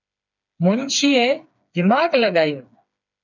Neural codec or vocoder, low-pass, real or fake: codec, 16 kHz, 4 kbps, FreqCodec, smaller model; 7.2 kHz; fake